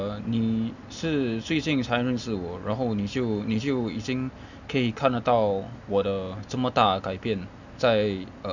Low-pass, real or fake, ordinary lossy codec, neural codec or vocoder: 7.2 kHz; real; none; none